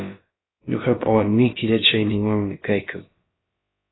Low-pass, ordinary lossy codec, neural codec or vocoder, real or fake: 7.2 kHz; AAC, 16 kbps; codec, 16 kHz, about 1 kbps, DyCAST, with the encoder's durations; fake